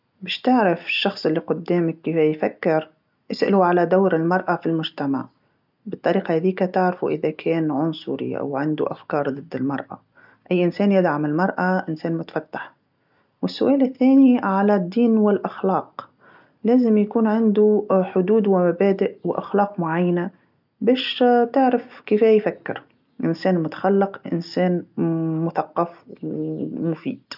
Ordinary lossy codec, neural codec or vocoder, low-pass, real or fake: none; none; 5.4 kHz; real